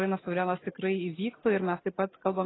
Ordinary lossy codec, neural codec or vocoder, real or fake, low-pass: AAC, 16 kbps; none; real; 7.2 kHz